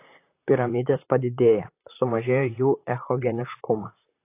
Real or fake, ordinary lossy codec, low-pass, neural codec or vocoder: fake; AAC, 24 kbps; 3.6 kHz; vocoder, 44.1 kHz, 128 mel bands, Pupu-Vocoder